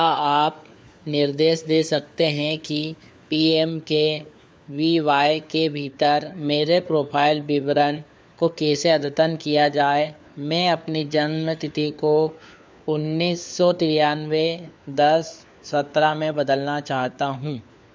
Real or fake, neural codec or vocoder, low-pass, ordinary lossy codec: fake; codec, 16 kHz, 4 kbps, FunCodec, trained on LibriTTS, 50 frames a second; none; none